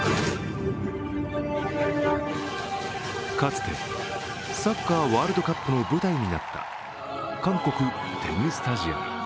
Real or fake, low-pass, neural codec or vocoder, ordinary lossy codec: real; none; none; none